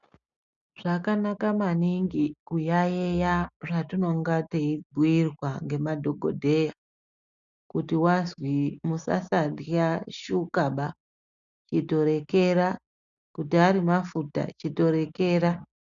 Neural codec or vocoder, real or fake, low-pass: none; real; 7.2 kHz